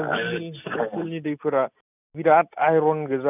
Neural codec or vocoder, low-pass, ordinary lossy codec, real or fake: none; 3.6 kHz; none; real